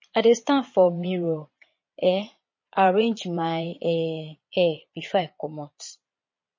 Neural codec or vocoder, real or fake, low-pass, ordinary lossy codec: vocoder, 22.05 kHz, 80 mel bands, Vocos; fake; 7.2 kHz; MP3, 32 kbps